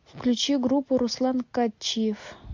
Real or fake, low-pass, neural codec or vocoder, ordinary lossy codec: real; 7.2 kHz; none; MP3, 48 kbps